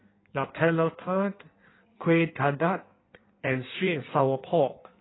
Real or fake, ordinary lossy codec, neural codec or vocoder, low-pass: fake; AAC, 16 kbps; codec, 16 kHz in and 24 kHz out, 1.1 kbps, FireRedTTS-2 codec; 7.2 kHz